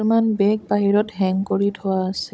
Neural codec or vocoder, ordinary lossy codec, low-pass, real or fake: codec, 16 kHz, 16 kbps, FunCodec, trained on Chinese and English, 50 frames a second; none; none; fake